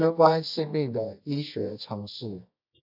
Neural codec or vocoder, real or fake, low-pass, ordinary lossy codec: codec, 24 kHz, 0.9 kbps, WavTokenizer, medium music audio release; fake; 5.4 kHz; AAC, 48 kbps